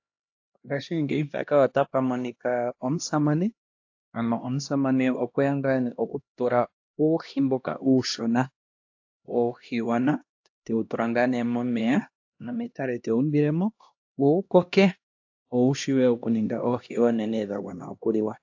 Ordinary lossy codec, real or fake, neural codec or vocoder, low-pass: AAC, 48 kbps; fake; codec, 16 kHz, 1 kbps, X-Codec, HuBERT features, trained on LibriSpeech; 7.2 kHz